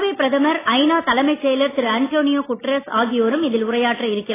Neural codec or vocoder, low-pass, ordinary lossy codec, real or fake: none; 3.6 kHz; AAC, 16 kbps; real